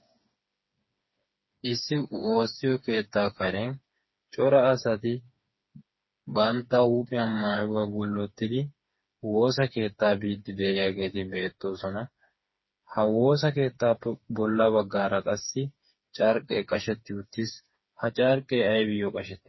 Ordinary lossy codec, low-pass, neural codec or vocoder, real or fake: MP3, 24 kbps; 7.2 kHz; codec, 16 kHz, 4 kbps, FreqCodec, smaller model; fake